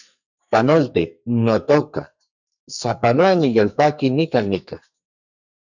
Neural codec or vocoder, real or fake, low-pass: codec, 44.1 kHz, 2.6 kbps, SNAC; fake; 7.2 kHz